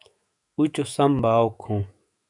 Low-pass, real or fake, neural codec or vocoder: 10.8 kHz; fake; autoencoder, 48 kHz, 128 numbers a frame, DAC-VAE, trained on Japanese speech